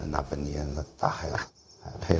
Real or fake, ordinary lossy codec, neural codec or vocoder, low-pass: fake; none; codec, 16 kHz, 0.4 kbps, LongCat-Audio-Codec; none